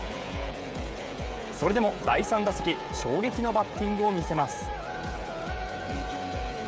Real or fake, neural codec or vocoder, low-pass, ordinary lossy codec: fake; codec, 16 kHz, 16 kbps, FreqCodec, smaller model; none; none